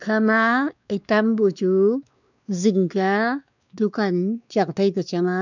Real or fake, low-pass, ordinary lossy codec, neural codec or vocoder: fake; 7.2 kHz; none; codec, 16 kHz, 2 kbps, X-Codec, WavLM features, trained on Multilingual LibriSpeech